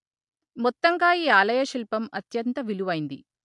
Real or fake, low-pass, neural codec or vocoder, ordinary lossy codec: real; 10.8 kHz; none; MP3, 64 kbps